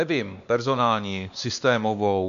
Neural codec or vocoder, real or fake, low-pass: codec, 16 kHz, 2 kbps, X-Codec, WavLM features, trained on Multilingual LibriSpeech; fake; 7.2 kHz